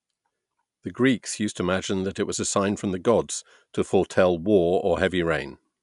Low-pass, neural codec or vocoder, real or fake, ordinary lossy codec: 10.8 kHz; none; real; none